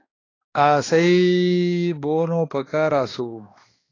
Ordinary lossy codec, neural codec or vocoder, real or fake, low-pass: AAC, 32 kbps; codec, 16 kHz, 2 kbps, X-Codec, HuBERT features, trained on balanced general audio; fake; 7.2 kHz